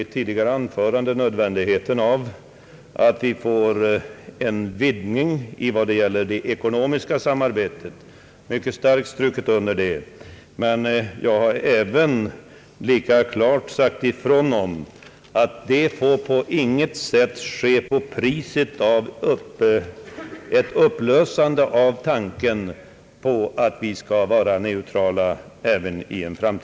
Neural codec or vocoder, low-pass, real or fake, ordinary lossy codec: none; none; real; none